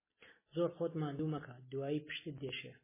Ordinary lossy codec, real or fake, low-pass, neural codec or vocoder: MP3, 16 kbps; real; 3.6 kHz; none